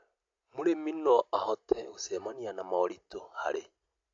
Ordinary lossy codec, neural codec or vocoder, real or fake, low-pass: AAC, 48 kbps; none; real; 7.2 kHz